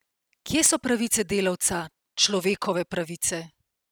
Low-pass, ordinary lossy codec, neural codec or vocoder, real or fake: none; none; none; real